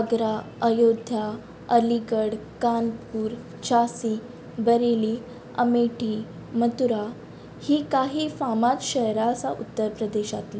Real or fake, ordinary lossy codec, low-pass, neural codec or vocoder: real; none; none; none